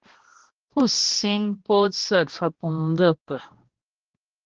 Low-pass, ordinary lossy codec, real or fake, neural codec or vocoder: 7.2 kHz; Opus, 16 kbps; fake; codec, 16 kHz, 1 kbps, X-Codec, HuBERT features, trained on balanced general audio